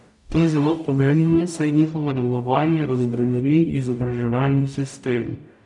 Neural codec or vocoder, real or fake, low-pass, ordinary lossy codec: codec, 44.1 kHz, 0.9 kbps, DAC; fake; 10.8 kHz; none